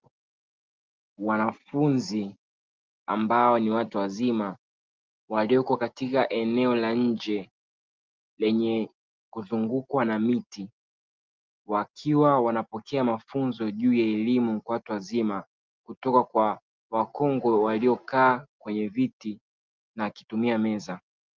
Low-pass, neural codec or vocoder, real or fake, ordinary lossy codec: 7.2 kHz; none; real; Opus, 24 kbps